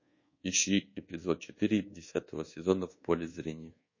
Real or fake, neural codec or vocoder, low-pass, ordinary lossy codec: fake; codec, 24 kHz, 1.2 kbps, DualCodec; 7.2 kHz; MP3, 32 kbps